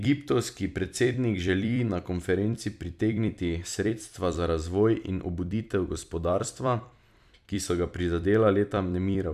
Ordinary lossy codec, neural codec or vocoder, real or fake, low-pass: none; vocoder, 44.1 kHz, 128 mel bands every 256 samples, BigVGAN v2; fake; 14.4 kHz